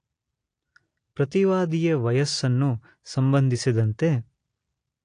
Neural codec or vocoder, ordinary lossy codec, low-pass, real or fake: none; AAC, 48 kbps; 9.9 kHz; real